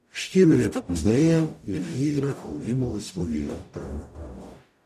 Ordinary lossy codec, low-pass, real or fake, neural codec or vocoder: none; 14.4 kHz; fake; codec, 44.1 kHz, 0.9 kbps, DAC